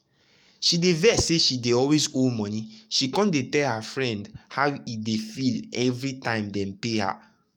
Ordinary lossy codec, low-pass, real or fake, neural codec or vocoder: none; 19.8 kHz; fake; codec, 44.1 kHz, 7.8 kbps, DAC